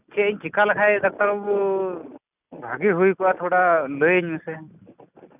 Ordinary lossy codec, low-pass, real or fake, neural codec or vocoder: none; 3.6 kHz; real; none